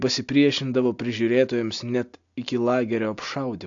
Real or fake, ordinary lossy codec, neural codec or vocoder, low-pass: real; AAC, 64 kbps; none; 7.2 kHz